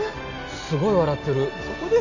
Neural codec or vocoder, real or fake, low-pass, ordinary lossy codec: none; real; 7.2 kHz; none